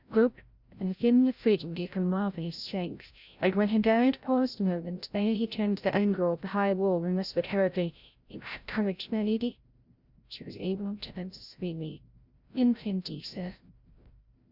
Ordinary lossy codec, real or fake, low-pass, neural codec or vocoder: Opus, 64 kbps; fake; 5.4 kHz; codec, 16 kHz, 0.5 kbps, FreqCodec, larger model